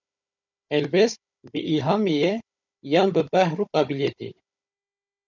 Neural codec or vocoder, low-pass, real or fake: codec, 16 kHz, 16 kbps, FunCodec, trained on Chinese and English, 50 frames a second; 7.2 kHz; fake